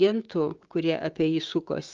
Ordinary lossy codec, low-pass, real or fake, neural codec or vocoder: Opus, 32 kbps; 7.2 kHz; fake; codec, 16 kHz, 6 kbps, DAC